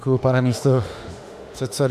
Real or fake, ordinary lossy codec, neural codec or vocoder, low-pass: fake; AAC, 96 kbps; autoencoder, 48 kHz, 32 numbers a frame, DAC-VAE, trained on Japanese speech; 14.4 kHz